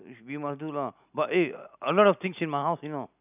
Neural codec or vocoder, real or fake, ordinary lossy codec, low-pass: none; real; none; 3.6 kHz